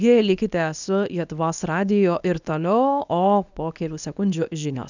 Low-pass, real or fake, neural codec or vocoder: 7.2 kHz; fake; codec, 24 kHz, 0.9 kbps, WavTokenizer, medium speech release version 1